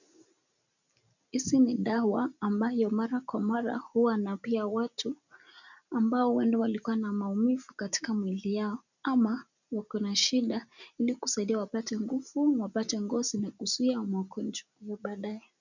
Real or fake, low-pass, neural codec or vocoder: real; 7.2 kHz; none